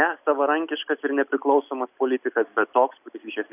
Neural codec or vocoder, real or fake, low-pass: none; real; 3.6 kHz